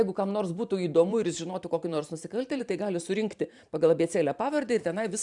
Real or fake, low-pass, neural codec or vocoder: fake; 10.8 kHz; vocoder, 44.1 kHz, 128 mel bands every 512 samples, BigVGAN v2